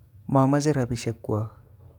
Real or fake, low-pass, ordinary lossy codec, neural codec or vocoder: fake; 19.8 kHz; none; codec, 44.1 kHz, 7.8 kbps, DAC